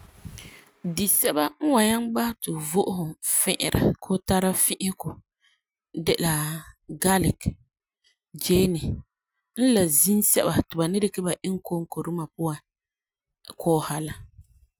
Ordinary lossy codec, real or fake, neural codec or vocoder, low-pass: none; real; none; none